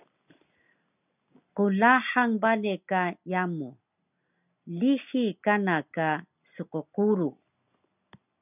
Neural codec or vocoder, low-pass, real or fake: none; 3.6 kHz; real